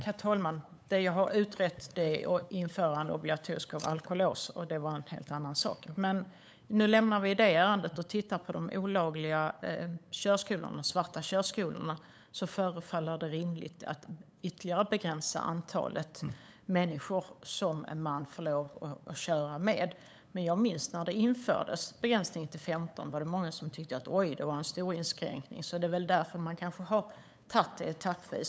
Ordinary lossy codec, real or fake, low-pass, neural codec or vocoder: none; fake; none; codec, 16 kHz, 8 kbps, FunCodec, trained on LibriTTS, 25 frames a second